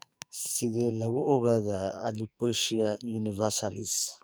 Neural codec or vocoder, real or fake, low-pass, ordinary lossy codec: codec, 44.1 kHz, 2.6 kbps, SNAC; fake; none; none